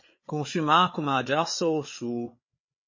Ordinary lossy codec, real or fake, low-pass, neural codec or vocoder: MP3, 32 kbps; fake; 7.2 kHz; codec, 16 kHz, 4 kbps, FreqCodec, larger model